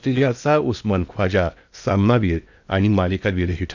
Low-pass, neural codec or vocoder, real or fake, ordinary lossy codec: 7.2 kHz; codec, 16 kHz in and 24 kHz out, 0.8 kbps, FocalCodec, streaming, 65536 codes; fake; none